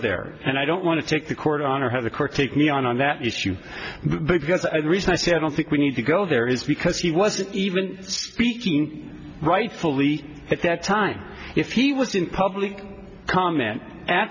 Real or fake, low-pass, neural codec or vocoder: real; 7.2 kHz; none